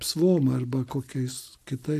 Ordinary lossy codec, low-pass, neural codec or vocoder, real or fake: AAC, 64 kbps; 14.4 kHz; none; real